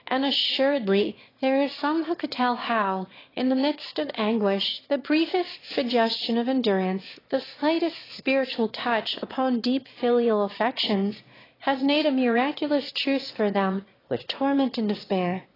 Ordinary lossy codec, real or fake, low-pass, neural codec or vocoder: AAC, 24 kbps; fake; 5.4 kHz; autoencoder, 22.05 kHz, a latent of 192 numbers a frame, VITS, trained on one speaker